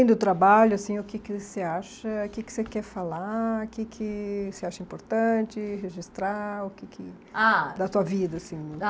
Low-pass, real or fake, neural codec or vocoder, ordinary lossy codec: none; real; none; none